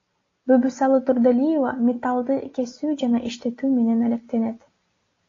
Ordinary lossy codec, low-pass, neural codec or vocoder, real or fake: AAC, 32 kbps; 7.2 kHz; none; real